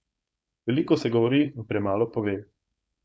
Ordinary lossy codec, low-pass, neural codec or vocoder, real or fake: none; none; codec, 16 kHz, 4.8 kbps, FACodec; fake